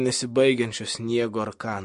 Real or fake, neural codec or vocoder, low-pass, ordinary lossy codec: real; none; 14.4 kHz; MP3, 48 kbps